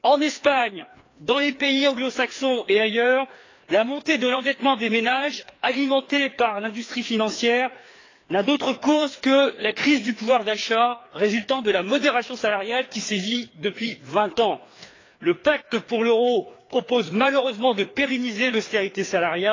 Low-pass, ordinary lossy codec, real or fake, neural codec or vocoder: 7.2 kHz; AAC, 32 kbps; fake; codec, 16 kHz, 2 kbps, FreqCodec, larger model